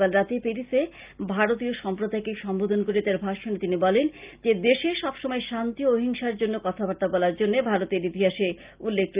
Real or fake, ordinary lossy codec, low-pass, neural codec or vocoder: real; Opus, 24 kbps; 3.6 kHz; none